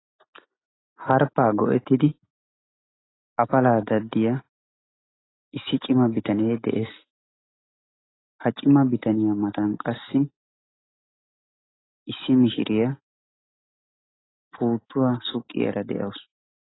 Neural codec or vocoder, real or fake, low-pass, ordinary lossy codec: none; real; 7.2 kHz; AAC, 16 kbps